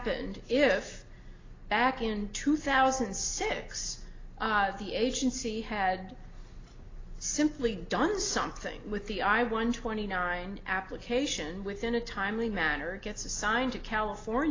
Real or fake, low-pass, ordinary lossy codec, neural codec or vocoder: real; 7.2 kHz; AAC, 32 kbps; none